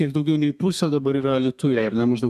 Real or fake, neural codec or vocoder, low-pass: fake; codec, 32 kHz, 1.9 kbps, SNAC; 14.4 kHz